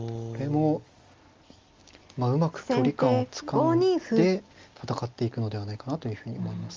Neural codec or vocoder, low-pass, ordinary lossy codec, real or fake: none; 7.2 kHz; Opus, 32 kbps; real